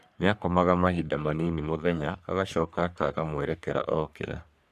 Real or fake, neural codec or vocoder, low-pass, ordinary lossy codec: fake; codec, 44.1 kHz, 3.4 kbps, Pupu-Codec; 14.4 kHz; none